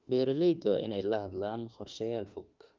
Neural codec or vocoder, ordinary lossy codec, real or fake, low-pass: autoencoder, 48 kHz, 32 numbers a frame, DAC-VAE, trained on Japanese speech; Opus, 24 kbps; fake; 7.2 kHz